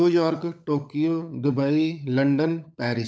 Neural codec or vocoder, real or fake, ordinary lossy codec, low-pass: codec, 16 kHz, 16 kbps, FunCodec, trained on LibriTTS, 50 frames a second; fake; none; none